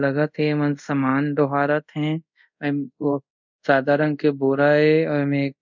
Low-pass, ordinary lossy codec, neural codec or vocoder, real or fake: 7.2 kHz; none; codec, 24 kHz, 0.5 kbps, DualCodec; fake